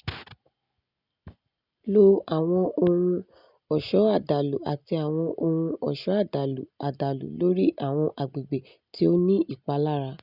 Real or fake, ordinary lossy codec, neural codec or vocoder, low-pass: real; none; none; 5.4 kHz